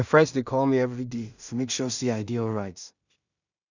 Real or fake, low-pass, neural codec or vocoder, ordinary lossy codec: fake; 7.2 kHz; codec, 16 kHz in and 24 kHz out, 0.4 kbps, LongCat-Audio-Codec, two codebook decoder; none